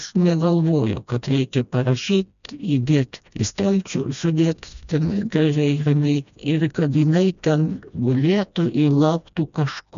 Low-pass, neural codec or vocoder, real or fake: 7.2 kHz; codec, 16 kHz, 1 kbps, FreqCodec, smaller model; fake